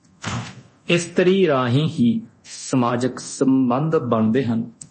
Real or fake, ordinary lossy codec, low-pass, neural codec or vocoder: fake; MP3, 32 kbps; 10.8 kHz; codec, 24 kHz, 0.9 kbps, DualCodec